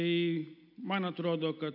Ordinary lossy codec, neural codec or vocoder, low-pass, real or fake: AAC, 48 kbps; none; 5.4 kHz; real